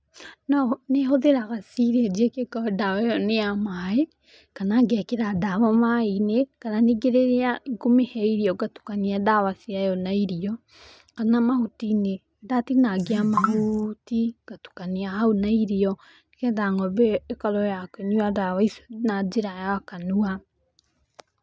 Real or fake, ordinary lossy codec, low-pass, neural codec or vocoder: real; none; none; none